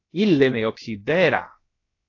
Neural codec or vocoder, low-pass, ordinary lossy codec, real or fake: codec, 16 kHz, about 1 kbps, DyCAST, with the encoder's durations; 7.2 kHz; AAC, 32 kbps; fake